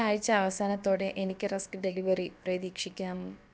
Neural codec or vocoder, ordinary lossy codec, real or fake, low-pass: codec, 16 kHz, about 1 kbps, DyCAST, with the encoder's durations; none; fake; none